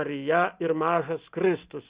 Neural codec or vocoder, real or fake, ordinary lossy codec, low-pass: none; real; Opus, 64 kbps; 3.6 kHz